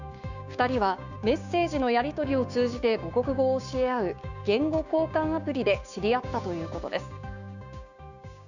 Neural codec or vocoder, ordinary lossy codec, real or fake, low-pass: codec, 16 kHz, 6 kbps, DAC; none; fake; 7.2 kHz